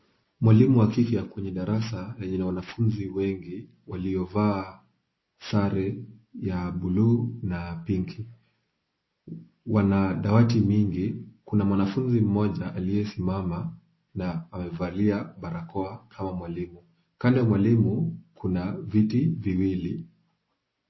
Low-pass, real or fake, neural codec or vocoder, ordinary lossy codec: 7.2 kHz; real; none; MP3, 24 kbps